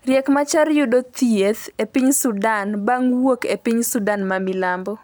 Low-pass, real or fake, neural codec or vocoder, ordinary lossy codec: none; real; none; none